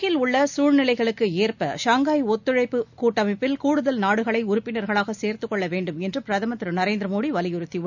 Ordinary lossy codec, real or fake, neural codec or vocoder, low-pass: none; real; none; 7.2 kHz